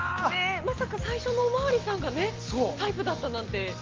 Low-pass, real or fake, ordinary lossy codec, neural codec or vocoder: 7.2 kHz; real; Opus, 16 kbps; none